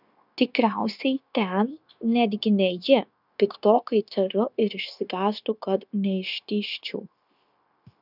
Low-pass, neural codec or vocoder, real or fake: 5.4 kHz; codec, 16 kHz, 0.9 kbps, LongCat-Audio-Codec; fake